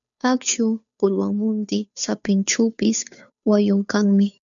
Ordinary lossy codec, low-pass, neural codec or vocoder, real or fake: MP3, 96 kbps; 7.2 kHz; codec, 16 kHz, 2 kbps, FunCodec, trained on Chinese and English, 25 frames a second; fake